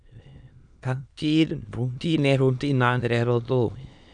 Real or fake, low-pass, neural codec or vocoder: fake; 9.9 kHz; autoencoder, 22.05 kHz, a latent of 192 numbers a frame, VITS, trained on many speakers